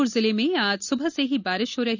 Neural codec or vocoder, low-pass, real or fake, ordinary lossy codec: none; 7.2 kHz; real; none